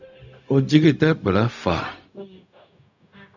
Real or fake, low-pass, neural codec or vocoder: fake; 7.2 kHz; codec, 16 kHz, 0.4 kbps, LongCat-Audio-Codec